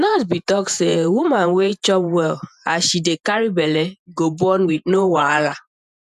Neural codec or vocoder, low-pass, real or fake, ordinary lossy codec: vocoder, 48 kHz, 128 mel bands, Vocos; 14.4 kHz; fake; none